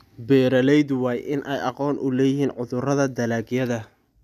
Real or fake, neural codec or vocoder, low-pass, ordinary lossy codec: real; none; 14.4 kHz; none